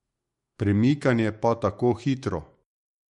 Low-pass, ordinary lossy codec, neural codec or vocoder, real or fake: 19.8 kHz; MP3, 48 kbps; autoencoder, 48 kHz, 128 numbers a frame, DAC-VAE, trained on Japanese speech; fake